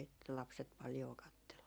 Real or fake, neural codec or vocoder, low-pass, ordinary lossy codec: fake; vocoder, 44.1 kHz, 128 mel bands every 256 samples, BigVGAN v2; none; none